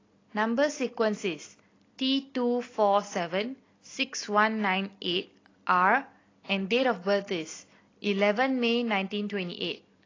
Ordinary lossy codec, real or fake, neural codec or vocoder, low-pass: AAC, 32 kbps; real; none; 7.2 kHz